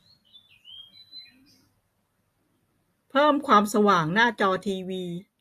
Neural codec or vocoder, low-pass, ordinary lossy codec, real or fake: none; 14.4 kHz; AAC, 64 kbps; real